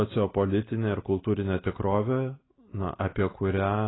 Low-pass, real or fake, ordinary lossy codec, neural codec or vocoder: 7.2 kHz; real; AAC, 16 kbps; none